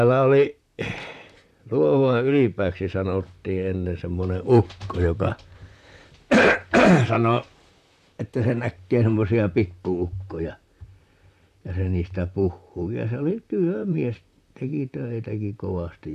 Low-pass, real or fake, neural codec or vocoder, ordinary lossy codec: 14.4 kHz; fake; vocoder, 44.1 kHz, 128 mel bands, Pupu-Vocoder; none